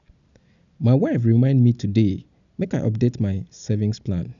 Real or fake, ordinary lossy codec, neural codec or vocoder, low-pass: real; none; none; 7.2 kHz